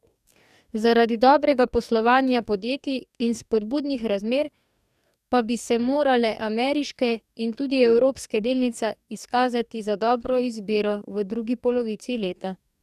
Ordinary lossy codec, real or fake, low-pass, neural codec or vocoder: none; fake; 14.4 kHz; codec, 44.1 kHz, 2.6 kbps, DAC